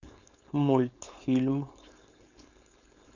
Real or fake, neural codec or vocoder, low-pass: fake; codec, 16 kHz, 4.8 kbps, FACodec; 7.2 kHz